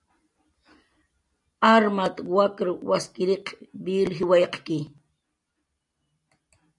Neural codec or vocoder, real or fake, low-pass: none; real; 10.8 kHz